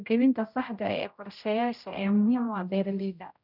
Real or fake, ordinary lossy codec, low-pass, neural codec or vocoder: fake; none; 5.4 kHz; codec, 16 kHz, 0.5 kbps, X-Codec, HuBERT features, trained on general audio